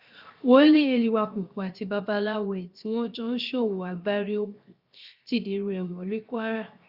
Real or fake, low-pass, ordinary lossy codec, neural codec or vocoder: fake; 5.4 kHz; Opus, 64 kbps; codec, 16 kHz, 0.7 kbps, FocalCodec